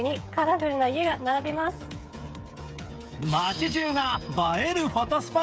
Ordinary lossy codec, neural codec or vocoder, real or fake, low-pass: none; codec, 16 kHz, 8 kbps, FreqCodec, smaller model; fake; none